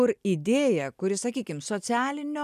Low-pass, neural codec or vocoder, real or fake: 14.4 kHz; none; real